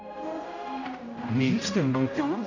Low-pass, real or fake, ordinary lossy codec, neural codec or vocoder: 7.2 kHz; fake; none; codec, 16 kHz, 0.5 kbps, X-Codec, HuBERT features, trained on general audio